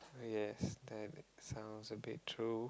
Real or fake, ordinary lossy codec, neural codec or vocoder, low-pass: real; none; none; none